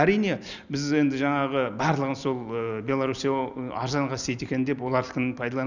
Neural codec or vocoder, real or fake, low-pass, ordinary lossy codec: none; real; 7.2 kHz; none